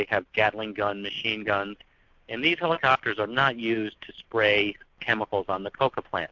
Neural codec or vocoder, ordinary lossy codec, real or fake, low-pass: none; MP3, 64 kbps; real; 7.2 kHz